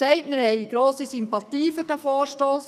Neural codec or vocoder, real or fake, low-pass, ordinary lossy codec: codec, 44.1 kHz, 2.6 kbps, SNAC; fake; 14.4 kHz; none